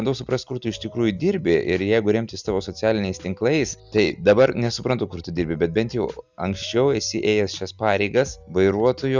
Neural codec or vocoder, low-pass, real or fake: none; 7.2 kHz; real